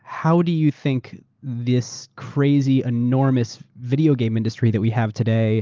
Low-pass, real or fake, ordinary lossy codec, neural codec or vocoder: 7.2 kHz; real; Opus, 32 kbps; none